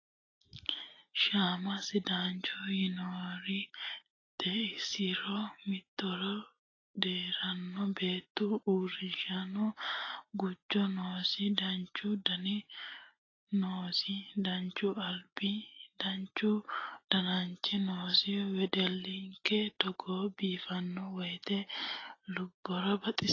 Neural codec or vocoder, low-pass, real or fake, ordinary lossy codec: none; 7.2 kHz; real; AAC, 32 kbps